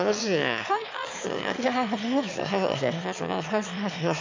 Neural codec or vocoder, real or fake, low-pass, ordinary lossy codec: autoencoder, 22.05 kHz, a latent of 192 numbers a frame, VITS, trained on one speaker; fake; 7.2 kHz; MP3, 64 kbps